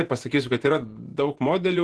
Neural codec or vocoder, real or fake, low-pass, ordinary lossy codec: vocoder, 48 kHz, 128 mel bands, Vocos; fake; 10.8 kHz; Opus, 16 kbps